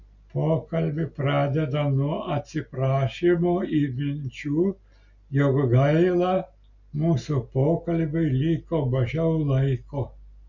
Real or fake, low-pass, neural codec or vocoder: real; 7.2 kHz; none